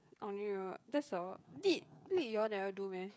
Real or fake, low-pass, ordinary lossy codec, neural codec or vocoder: fake; none; none; codec, 16 kHz, 16 kbps, FreqCodec, smaller model